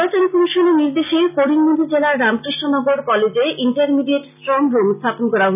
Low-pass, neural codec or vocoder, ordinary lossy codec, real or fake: 3.6 kHz; none; none; real